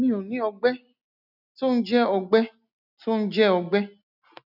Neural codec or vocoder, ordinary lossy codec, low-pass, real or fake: none; none; 5.4 kHz; real